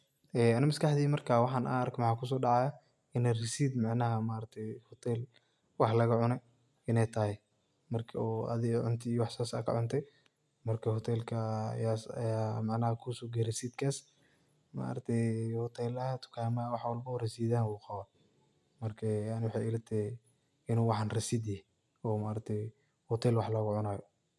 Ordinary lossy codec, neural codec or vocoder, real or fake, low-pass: none; none; real; none